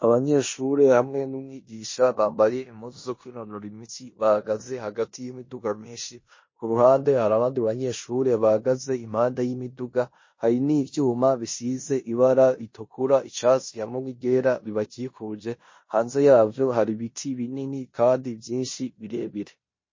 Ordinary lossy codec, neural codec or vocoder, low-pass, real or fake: MP3, 32 kbps; codec, 16 kHz in and 24 kHz out, 0.9 kbps, LongCat-Audio-Codec, four codebook decoder; 7.2 kHz; fake